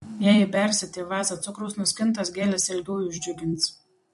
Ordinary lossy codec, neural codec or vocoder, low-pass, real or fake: MP3, 48 kbps; vocoder, 44.1 kHz, 128 mel bands every 256 samples, BigVGAN v2; 14.4 kHz; fake